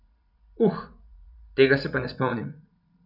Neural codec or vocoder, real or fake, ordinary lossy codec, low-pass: vocoder, 44.1 kHz, 80 mel bands, Vocos; fake; none; 5.4 kHz